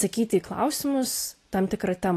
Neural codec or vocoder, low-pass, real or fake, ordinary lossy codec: none; 14.4 kHz; real; AAC, 48 kbps